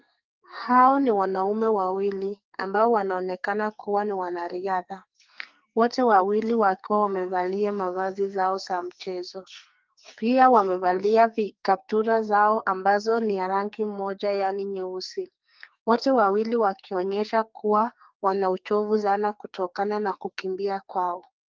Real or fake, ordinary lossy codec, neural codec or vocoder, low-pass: fake; Opus, 24 kbps; codec, 44.1 kHz, 2.6 kbps, SNAC; 7.2 kHz